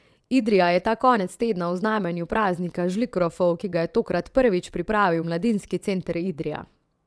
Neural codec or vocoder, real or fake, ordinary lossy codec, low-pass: vocoder, 22.05 kHz, 80 mel bands, WaveNeXt; fake; none; none